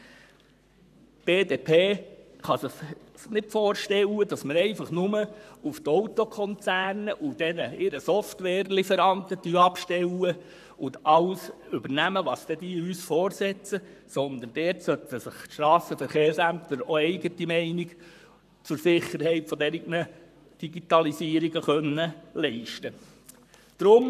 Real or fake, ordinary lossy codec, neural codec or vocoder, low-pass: fake; none; codec, 44.1 kHz, 7.8 kbps, Pupu-Codec; 14.4 kHz